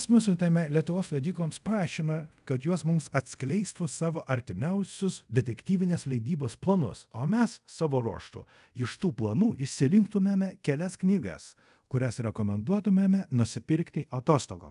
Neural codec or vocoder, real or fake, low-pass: codec, 24 kHz, 0.5 kbps, DualCodec; fake; 10.8 kHz